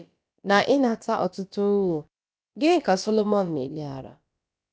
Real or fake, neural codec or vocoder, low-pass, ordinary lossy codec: fake; codec, 16 kHz, about 1 kbps, DyCAST, with the encoder's durations; none; none